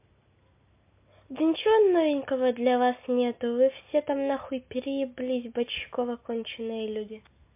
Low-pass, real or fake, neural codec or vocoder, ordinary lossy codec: 3.6 kHz; real; none; MP3, 32 kbps